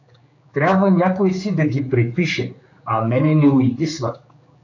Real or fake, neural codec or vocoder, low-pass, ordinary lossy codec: fake; codec, 16 kHz, 4 kbps, X-Codec, HuBERT features, trained on general audio; 7.2 kHz; AAC, 48 kbps